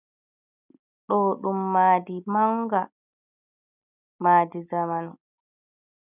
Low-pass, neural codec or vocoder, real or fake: 3.6 kHz; none; real